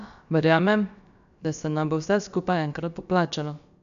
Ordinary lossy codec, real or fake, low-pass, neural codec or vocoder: none; fake; 7.2 kHz; codec, 16 kHz, about 1 kbps, DyCAST, with the encoder's durations